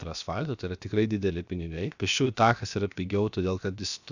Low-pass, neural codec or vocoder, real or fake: 7.2 kHz; codec, 16 kHz, about 1 kbps, DyCAST, with the encoder's durations; fake